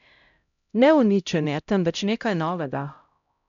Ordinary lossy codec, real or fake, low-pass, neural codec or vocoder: MP3, 96 kbps; fake; 7.2 kHz; codec, 16 kHz, 0.5 kbps, X-Codec, HuBERT features, trained on LibriSpeech